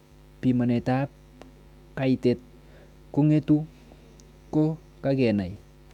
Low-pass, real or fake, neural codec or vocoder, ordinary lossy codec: 19.8 kHz; fake; autoencoder, 48 kHz, 128 numbers a frame, DAC-VAE, trained on Japanese speech; none